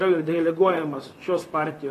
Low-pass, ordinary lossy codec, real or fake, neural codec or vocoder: 14.4 kHz; AAC, 48 kbps; fake; vocoder, 44.1 kHz, 128 mel bands, Pupu-Vocoder